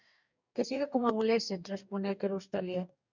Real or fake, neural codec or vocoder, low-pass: fake; codec, 44.1 kHz, 2.6 kbps, DAC; 7.2 kHz